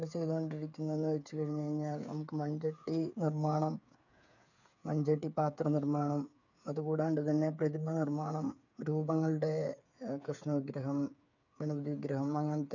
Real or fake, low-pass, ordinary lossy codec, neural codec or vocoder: fake; 7.2 kHz; none; codec, 16 kHz, 8 kbps, FreqCodec, smaller model